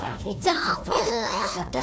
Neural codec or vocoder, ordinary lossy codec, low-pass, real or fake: codec, 16 kHz, 1 kbps, FunCodec, trained on Chinese and English, 50 frames a second; none; none; fake